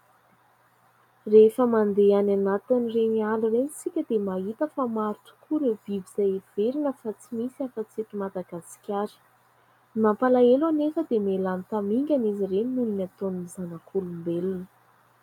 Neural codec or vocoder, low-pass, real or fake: none; 19.8 kHz; real